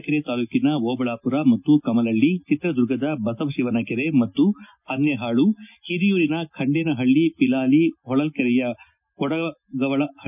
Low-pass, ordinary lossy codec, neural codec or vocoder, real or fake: 3.6 kHz; none; none; real